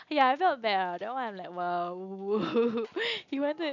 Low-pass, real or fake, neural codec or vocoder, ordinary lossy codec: 7.2 kHz; real; none; none